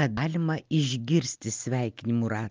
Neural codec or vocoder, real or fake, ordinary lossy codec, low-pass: none; real; Opus, 24 kbps; 7.2 kHz